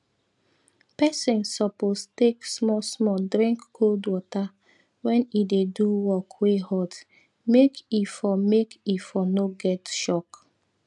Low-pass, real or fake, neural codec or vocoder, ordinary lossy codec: 10.8 kHz; real; none; none